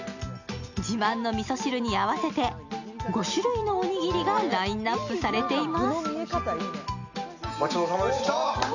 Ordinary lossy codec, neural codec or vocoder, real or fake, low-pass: none; none; real; 7.2 kHz